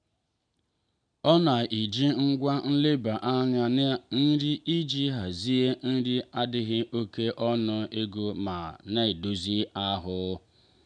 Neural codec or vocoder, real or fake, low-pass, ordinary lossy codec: none; real; 9.9 kHz; none